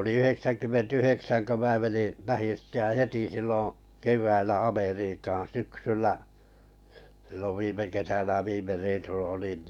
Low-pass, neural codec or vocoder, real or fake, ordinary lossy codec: 19.8 kHz; codec, 44.1 kHz, 7.8 kbps, DAC; fake; none